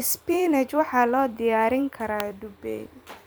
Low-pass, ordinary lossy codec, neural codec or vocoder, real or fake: none; none; vocoder, 44.1 kHz, 128 mel bands every 256 samples, BigVGAN v2; fake